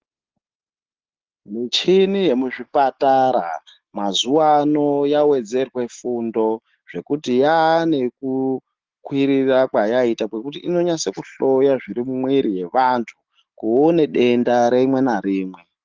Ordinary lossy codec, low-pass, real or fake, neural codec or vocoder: Opus, 16 kbps; 7.2 kHz; real; none